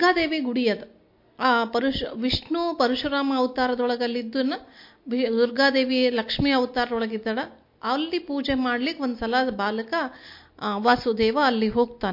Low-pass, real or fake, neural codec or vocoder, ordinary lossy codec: 5.4 kHz; real; none; MP3, 32 kbps